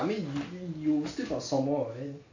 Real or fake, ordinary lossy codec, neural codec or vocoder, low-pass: real; AAC, 48 kbps; none; 7.2 kHz